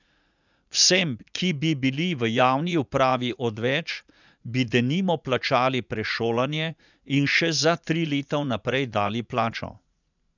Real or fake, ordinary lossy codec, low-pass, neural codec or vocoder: fake; none; 7.2 kHz; vocoder, 44.1 kHz, 80 mel bands, Vocos